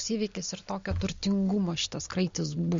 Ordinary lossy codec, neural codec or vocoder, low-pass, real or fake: MP3, 48 kbps; none; 7.2 kHz; real